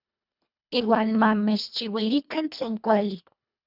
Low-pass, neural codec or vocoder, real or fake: 5.4 kHz; codec, 24 kHz, 1.5 kbps, HILCodec; fake